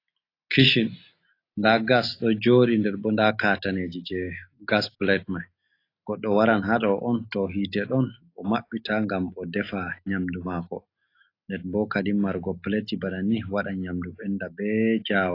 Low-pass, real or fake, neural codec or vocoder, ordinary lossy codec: 5.4 kHz; real; none; AAC, 32 kbps